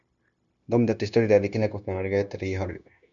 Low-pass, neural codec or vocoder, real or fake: 7.2 kHz; codec, 16 kHz, 0.9 kbps, LongCat-Audio-Codec; fake